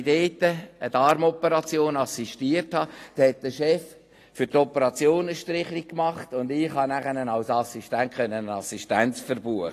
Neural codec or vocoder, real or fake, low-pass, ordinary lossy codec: none; real; 14.4 kHz; AAC, 48 kbps